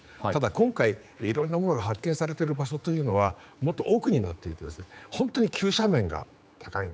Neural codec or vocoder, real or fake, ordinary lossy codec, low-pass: codec, 16 kHz, 4 kbps, X-Codec, HuBERT features, trained on general audio; fake; none; none